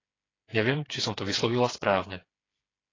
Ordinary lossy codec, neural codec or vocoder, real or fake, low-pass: AAC, 32 kbps; codec, 16 kHz, 4 kbps, FreqCodec, smaller model; fake; 7.2 kHz